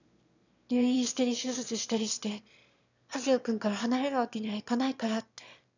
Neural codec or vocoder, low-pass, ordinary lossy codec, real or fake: autoencoder, 22.05 kHz, a latent of 192 numbers a frame, VITS, trained on one speaker; 7.2 kHz; none; fake